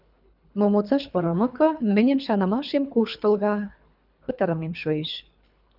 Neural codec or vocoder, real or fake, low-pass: codec, 24 kHz, 3 kbps, HILCodec; fake; 5.4 kHz